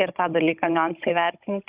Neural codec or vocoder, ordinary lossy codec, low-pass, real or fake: codec, 16 kHz, 16 kbps, FunCodec, trained on Chinese and English, 50 frames a second; Opus, 64 kbps; 3.6 kHz; fake